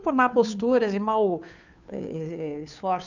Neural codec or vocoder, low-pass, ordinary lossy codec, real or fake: codec, 16 kHz, 2 kbps, FunCodec, trained on Chinese and English, 25 frames a second; 7.2 kHz; none; fake